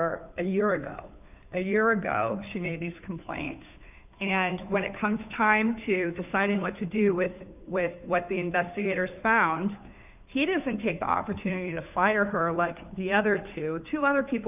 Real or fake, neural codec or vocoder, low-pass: fake; codec, 16 kHz, 2 kbps, FunCodec, trained on Chinese and English, 25 frames a second; 3.6 kHz